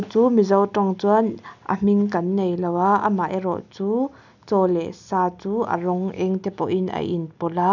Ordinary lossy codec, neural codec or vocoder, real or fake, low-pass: none; none; real; 7.2 kHz